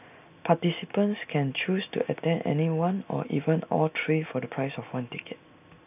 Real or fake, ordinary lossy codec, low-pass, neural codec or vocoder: real; none; 3.6 kHz; none